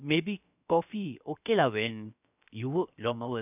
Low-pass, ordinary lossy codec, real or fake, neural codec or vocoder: 3.6 kHz; none; fake; codec, 16 kHz, about 1 kbps, DyCAST, with the encoder's durations